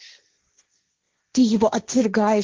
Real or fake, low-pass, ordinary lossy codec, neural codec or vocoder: fake; 7.2 kHz; Opus, 16 kbps; codec, 16 kHz, 1.1 kbps, Voila-Tokenizer